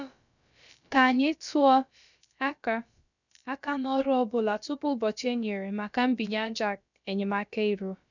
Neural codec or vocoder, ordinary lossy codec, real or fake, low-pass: codec, 16 kHz, about 1 kbps, DyCAST, with the encoder's durations; none; fake; 7.2 kHz